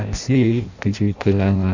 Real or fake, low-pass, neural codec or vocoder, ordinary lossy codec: fake; 7.2 kHz; codec, 16 kHz in and 24 kHz out, 0.6 kbps, FireRedTTS-2 codec; none